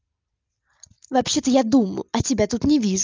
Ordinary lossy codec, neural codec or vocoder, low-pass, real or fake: Opus, 24 kbps; none; 7.2 kHz; real